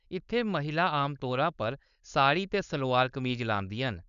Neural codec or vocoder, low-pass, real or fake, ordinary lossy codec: codec, 16 kHz, 4.8 kbps, FACodec; 7.2 kHz; fake; none